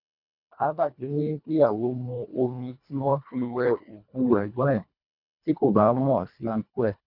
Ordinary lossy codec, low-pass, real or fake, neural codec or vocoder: none; 5.4 kHz; fake; codec, 24 kHz, 1.5 kbps, HILCodec